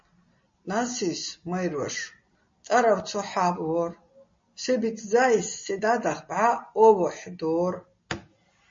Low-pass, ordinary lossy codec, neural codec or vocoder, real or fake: 7.2 kHz; MP3, 32 kbps; none; real